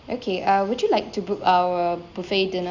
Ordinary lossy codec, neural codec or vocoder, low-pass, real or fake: none; none; 7.2 kHz; real